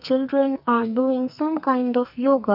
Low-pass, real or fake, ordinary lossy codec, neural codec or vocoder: 5.4 kHz; fake; none; codec, 44.1 kHz, 2.6 kbps, SNAC